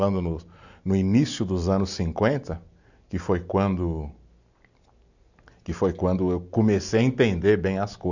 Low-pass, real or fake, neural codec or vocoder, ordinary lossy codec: 7.2 kHz; real; none; none